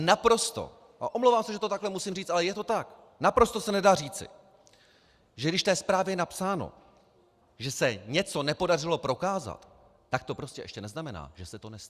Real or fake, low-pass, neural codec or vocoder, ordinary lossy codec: real; 14.4 kHz; none; Opus, 64 kbps